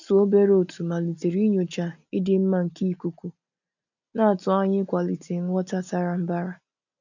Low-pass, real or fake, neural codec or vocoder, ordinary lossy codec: 7.2 kHz; real; none; none